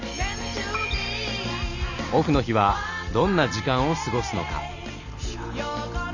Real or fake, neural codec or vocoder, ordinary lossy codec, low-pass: real; none; none; 7.2 kHz